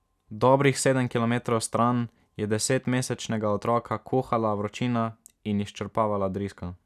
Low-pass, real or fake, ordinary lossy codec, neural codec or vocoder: 14.4 kHz; real; none; none